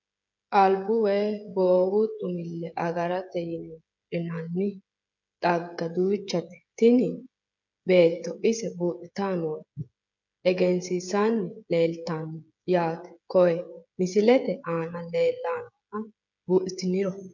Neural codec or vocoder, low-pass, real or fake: codec, 16 kHz, 16 kbps, FreqCodec, smaller model; 7.2 kHz; fake